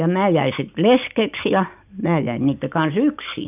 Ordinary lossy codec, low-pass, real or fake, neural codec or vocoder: none; 3.6 kHz; real; none